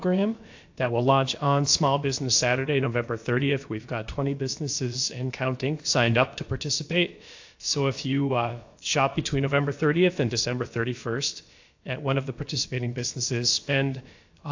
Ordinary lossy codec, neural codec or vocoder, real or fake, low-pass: AAC, 48 kbps; codec, 16 kHz, about 1 kbps, DyCAST, with the encoder's durations; fake; 7.2 kHz